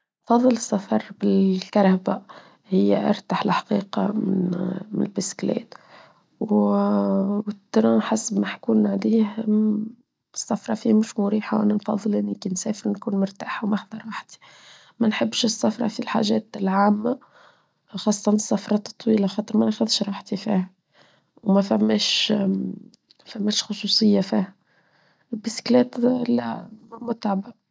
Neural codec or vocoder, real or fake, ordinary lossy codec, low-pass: none; real; none; none